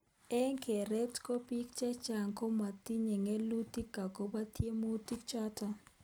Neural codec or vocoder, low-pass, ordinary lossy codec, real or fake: none; none; none; real